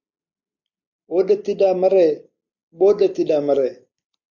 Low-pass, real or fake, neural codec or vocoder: 7.2 kHz; real; none